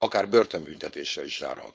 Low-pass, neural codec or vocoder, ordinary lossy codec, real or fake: none; codec, 16 kHz, 4.8 kbps, FACodec; none; fake